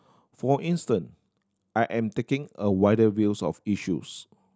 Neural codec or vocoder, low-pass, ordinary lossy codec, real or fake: none; none; none; real